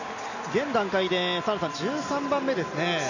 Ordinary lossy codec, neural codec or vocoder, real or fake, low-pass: none; none; real; 7.2 kHz